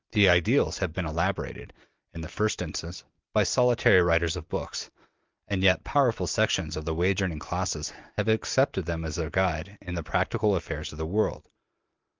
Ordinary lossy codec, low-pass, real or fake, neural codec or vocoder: Opus, 24 kbps; 7.2 kHz; real; none